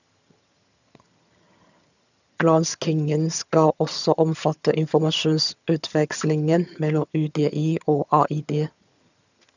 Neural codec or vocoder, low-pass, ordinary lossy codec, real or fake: vocoder, 22.05 kHz, 80 mel bands, HiFi-GAN; 7.2 kHz; none; fake